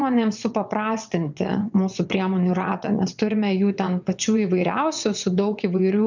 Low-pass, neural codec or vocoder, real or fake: 7.2 kHz; vocoder, 22.05 kHz, 80 mel bands, WaveNeXt; fake